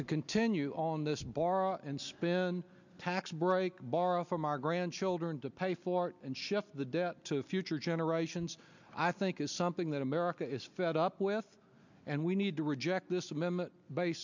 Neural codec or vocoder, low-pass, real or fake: none; 7.2 kHz; real